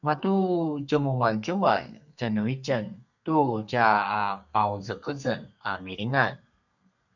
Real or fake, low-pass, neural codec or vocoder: fake; 7.2 kHz; codec, 32 kHz, 1.9 kbps, SNAC